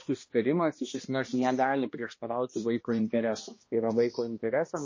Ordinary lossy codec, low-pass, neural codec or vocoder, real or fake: MP3, 32 kbps; 7.2 kHz; codec, 16 kHz, 1 kbps, X-Codec, HuBERT features, trained on balanced general audio; fake